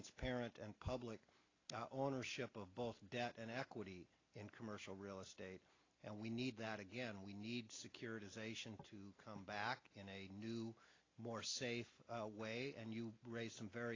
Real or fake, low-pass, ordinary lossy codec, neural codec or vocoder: real; 7.2 kHz; AAC, 32 kbps; none